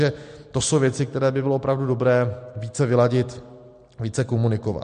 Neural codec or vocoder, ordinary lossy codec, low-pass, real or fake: none; MP3, 48 kbps; 9.9 kHz; real